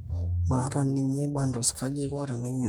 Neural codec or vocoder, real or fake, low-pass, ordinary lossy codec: codec, 44.1 kHz, 2.6 kbps, DAC; fake; none; none